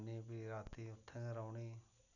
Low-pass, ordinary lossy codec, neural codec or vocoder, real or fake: 7.2 kHz; none; none; real